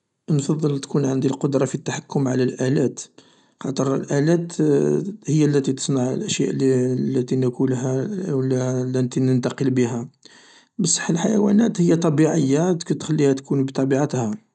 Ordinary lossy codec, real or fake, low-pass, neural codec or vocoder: none; real; 10.8 kHz; none